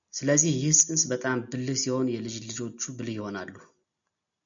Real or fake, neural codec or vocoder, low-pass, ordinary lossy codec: real; none; 7.2 kHz; MP3, 96 kbps